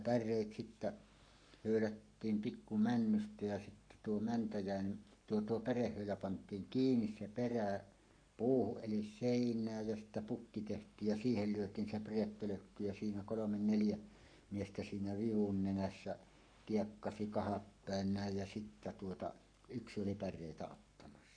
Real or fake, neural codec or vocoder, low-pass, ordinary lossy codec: fake; codec, 44.1 kHz, 7.8 kbps, Pupu-Codec; 9.9 kHz; none